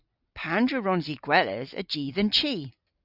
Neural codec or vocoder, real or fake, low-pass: none; real; 5.4 kHz